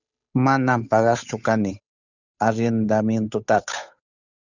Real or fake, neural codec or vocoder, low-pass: fake; codec, 16 kHz, 8 kbps, FunCodec, trained on Chinese and English, 25 frames a second; 7.2 kHz